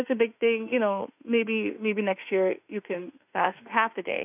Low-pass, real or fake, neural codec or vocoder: 3.6 kHz; fake; autoencoder, 48 kHz, 32 numbers a frame, DAC-VAE, trained on Japanese speech